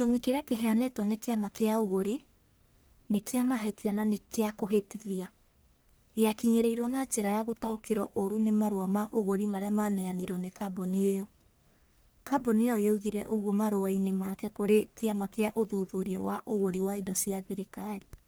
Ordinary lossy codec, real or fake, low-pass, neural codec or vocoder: none; fake; none; codec, 44.1 kHz, 1.7 kbps, Pupu-Codec